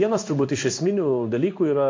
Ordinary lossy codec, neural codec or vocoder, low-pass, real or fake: MP3, 48 kbps; codec, 16 kHz in and 24 kHz out, 1 kbps, XY-Tokenizer; 7.2 kHz; fake